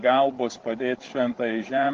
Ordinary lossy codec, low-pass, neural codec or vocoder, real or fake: Opus, 16 kbps; 7.2 kHz; codec, 16 kHz, 16 kbps, FreqCodec, larger model; fake